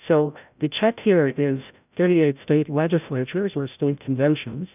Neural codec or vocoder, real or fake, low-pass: codec, 16 kHz, 0.5 kbps, FreqCodec, larger model; fake; 3.6 kHz